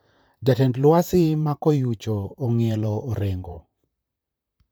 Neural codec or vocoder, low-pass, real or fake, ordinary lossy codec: vocoder, 44.1 kHz, 128 mel bands, Pupu-Vocoder; none; fake; none